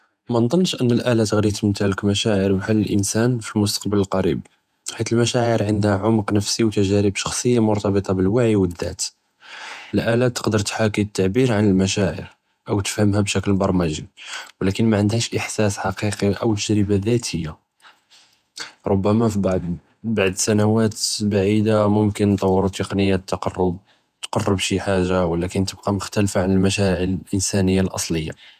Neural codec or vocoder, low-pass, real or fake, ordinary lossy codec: vocoder, 48 kHz, 128 mel bands, Vocos; 14.4 kHz; fake; none